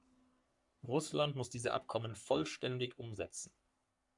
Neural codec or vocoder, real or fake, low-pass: codec, 44.1 kHz, 3.4 kbps, Pupu-Codec; fake; 10.8 kHz